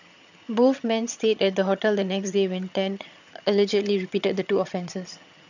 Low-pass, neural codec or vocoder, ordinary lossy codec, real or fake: 7.2 kHz; vocoder, 22.05 kHz, 80 mel bands, HiFi-GAN; none; fake